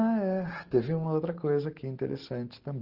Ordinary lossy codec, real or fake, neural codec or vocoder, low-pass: Opus, 16 kbps; real; none; 5.4 kHz